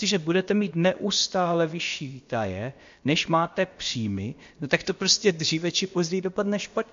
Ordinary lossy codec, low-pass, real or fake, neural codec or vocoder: MP3, 48 kbps; 7.2 kHz; fake; codec, 16 kHz, about 1 kbps, DyCAST, with the encoder's durations